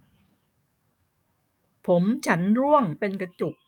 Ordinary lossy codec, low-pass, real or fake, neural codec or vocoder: none; 19.8 kHz; fake; codec, 44.1 kHz, 7.8 kbps, DAC